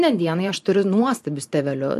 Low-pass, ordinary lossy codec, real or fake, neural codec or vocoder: 14.4 kHz; MP3, 64 kbps; fake; vocoder, 44.1 kHz, 128 mel bands every 512 samples, BigVGAN v2